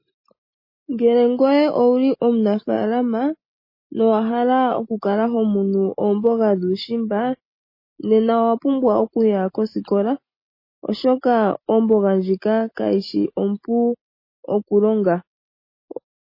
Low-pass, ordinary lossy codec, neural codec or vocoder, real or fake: 5.4 kHz; MP3, 24 kbps; none; real